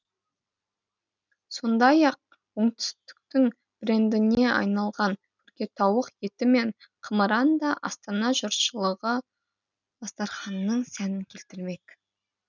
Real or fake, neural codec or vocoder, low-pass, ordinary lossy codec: real; none; 7.2 kHz; none